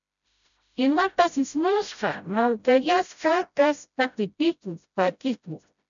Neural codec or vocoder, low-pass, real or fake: codec, 16 kHz, 0.5 kbps, FreqCodec, smaller model; 7.2 kHz; fake